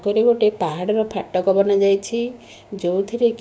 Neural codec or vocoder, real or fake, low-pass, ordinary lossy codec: codec, 16 kHz, 6 kbps, DAC; fake; none; none